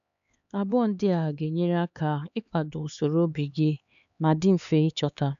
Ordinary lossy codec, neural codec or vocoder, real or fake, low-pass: none; codec, 16 kHz, 4 kbps, X-Codec, HuBERT features, trained on LibriSpeech; fake; 7.2 kHz